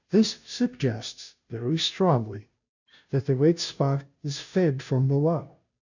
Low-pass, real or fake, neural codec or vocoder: 7.2 kHz; fake; codec, 16 kHz, 0.5 kbps, FunCodec, trained on Chinese and English, 25 frames a second